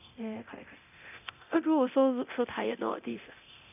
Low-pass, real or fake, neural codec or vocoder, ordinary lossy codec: 3.6 kHz; fake; codec, 24 kHz, 0.9 kbps, DualCodec; none